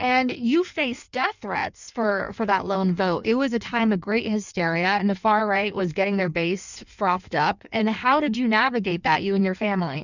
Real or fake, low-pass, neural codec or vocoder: fake; 7.2 kHz; codec, 16 kHz in and 24 kHz out, 1.1 kbps, FireRedTTS-2 codec